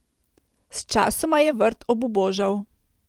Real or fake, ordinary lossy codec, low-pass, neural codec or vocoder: fake; Opus, 24 kbps; 19.8 kHz; vocoder, 44.1 kHz, 128 mel bands every 512 samples, BigVGAN v2